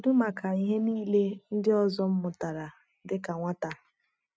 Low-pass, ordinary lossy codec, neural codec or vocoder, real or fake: none; none; none; real